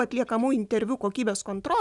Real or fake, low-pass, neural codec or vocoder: real; 10.8 kHz; none